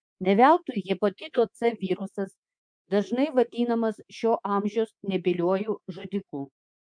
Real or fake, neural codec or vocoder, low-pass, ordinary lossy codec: fake; codec, 24 kHz, 3.1 kbps, DualCodec; 9.9 kHz; MP3, 64 kbps